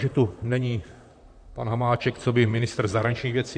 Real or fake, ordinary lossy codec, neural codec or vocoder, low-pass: fake; MP3, 64 kbps; vocoder, 44.1 kHz, 128 mel bands, Pupu-Vocoder; 9.9 kHz